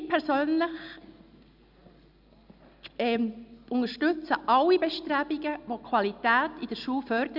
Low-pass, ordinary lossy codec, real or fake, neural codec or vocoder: 5.4 kHz; Opus, 64 kbps; real; none